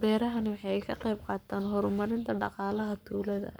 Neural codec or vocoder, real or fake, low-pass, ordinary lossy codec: codec, 44.1 kHz, 7.8 kbps, Pupu-Codec; fake; none; none